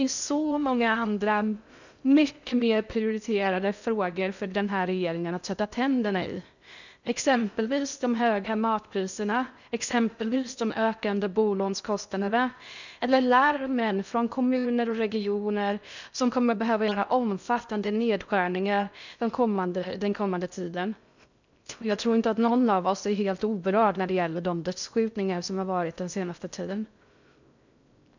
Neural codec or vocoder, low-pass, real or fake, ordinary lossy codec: codec, 16 kHz in and 24 kHz out, 0.6 kbps, FocalCodec, streaming, 2048 codes; 7.2 kHz; fake; none